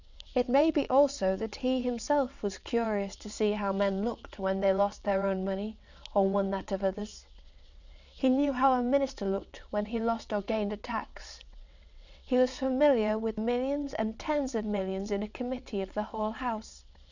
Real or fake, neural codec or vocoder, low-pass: fake; vocoder, 22.05 kHz, 80 mel bands, WaveNeXt; 7.2 kHz